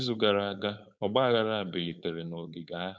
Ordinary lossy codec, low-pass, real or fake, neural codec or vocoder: none; none; fake; codec, 16 kHz, 4.8 kbps, FACodec